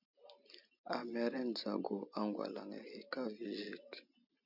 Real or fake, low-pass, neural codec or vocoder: real; 5.4 kHz; none